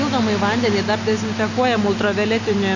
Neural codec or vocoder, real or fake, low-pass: none; real; 7.2 kHz